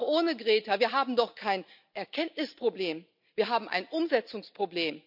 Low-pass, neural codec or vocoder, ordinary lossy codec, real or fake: 5.4 kHz; none; none; real